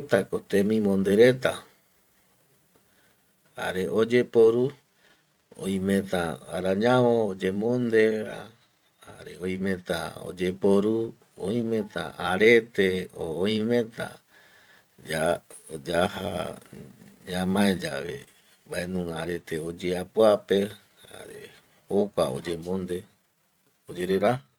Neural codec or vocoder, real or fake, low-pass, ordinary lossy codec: vocoder, 44.1 kHz, 128 mel bands every 512 samples, BigVGAN v2; fake; 19.8 kHz; none